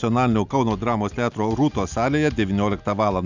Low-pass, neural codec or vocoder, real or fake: 7.2 kHz; none; real